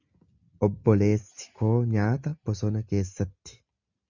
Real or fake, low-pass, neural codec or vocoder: real; 7.2 kHz; none